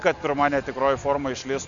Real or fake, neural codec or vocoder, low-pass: real; none; 7.2 kHz